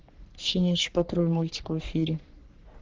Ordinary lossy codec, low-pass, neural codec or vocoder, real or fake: Opus, 16 kbps; 7.2 kHz; codec, 44.1 kHz, 3.4 kbps, Pupu-Codec; fake